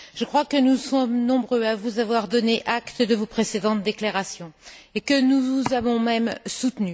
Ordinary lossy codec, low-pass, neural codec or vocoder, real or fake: none; none; none; real